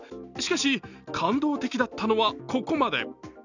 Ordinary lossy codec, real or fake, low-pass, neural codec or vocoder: none; real; 7.2 kHz; none